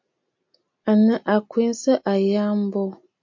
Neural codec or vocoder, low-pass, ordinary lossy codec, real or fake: none; 7.2 kHz; MP3, 48 kbps; real